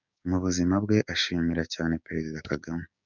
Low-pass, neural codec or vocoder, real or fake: 7.2 kHz; codec, 16 kHz, 6 kbps, DAC; fake